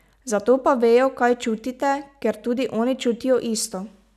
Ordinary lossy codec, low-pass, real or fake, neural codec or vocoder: none; 14.4 kHz; real; none